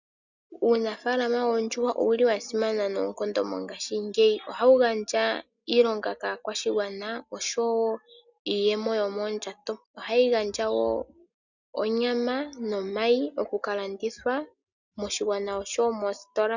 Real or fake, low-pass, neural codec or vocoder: real; 7.2 kHz; none